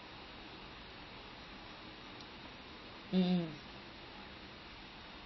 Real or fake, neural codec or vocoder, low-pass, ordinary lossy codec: real; none; 7.2 kHz; MP3, 24 kbps